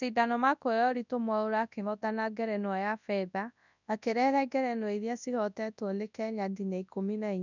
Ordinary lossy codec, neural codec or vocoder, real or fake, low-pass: none; codec, 24 kHz, 0.9 kbps, WavTokenizer, large speech release; fake; 7.2 kHz